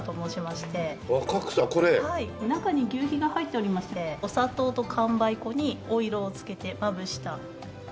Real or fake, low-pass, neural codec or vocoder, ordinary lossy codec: real; none; none; none